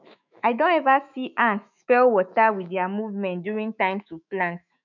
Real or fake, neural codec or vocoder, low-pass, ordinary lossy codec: fake; autoencoder, 48 kHz, 128 numbers a frame, DAC-VAE, trained on Japanese speech; 7.2 kHz; none